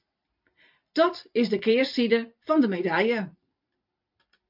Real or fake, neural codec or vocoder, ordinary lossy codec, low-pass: fake; vocoder, 44.1 kHz, 128 mel bands every 256 samples, BigVGAN v2; MP3, 48 kbps; 5.4 kHz